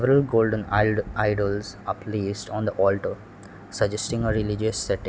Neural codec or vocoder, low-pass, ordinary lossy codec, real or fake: none; none; none; real